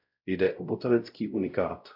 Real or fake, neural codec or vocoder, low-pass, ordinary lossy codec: fake; codec, 16 kHz, 0.5 kbps, X-Codec, WavLM features, trained on Multilingual LibriSpeech; 5.4 kHz; AAC, 48 kbps